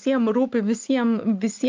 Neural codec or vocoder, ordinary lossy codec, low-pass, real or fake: none; Opus, 32 kbps; 7.2 kHz; real